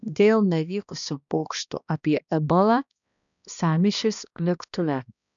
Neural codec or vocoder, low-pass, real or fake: codec, 16 kHz, 1 kbps, X-Codec, HuBERT features, trained on balanced general audio; 7.2 kHz; fake